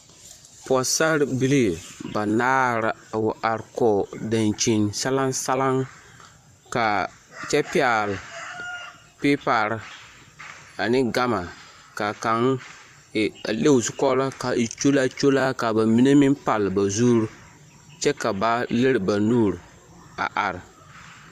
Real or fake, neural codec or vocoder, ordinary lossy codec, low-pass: fake; vocoder, 44.1 kHz, 128 mel bands, Pupu-Vocoder; AAC, 96 kbps; 14.4 kHz